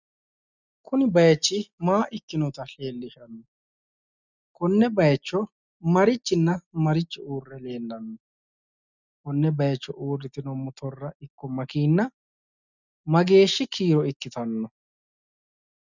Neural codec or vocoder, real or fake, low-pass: none; real; 7.2 kHz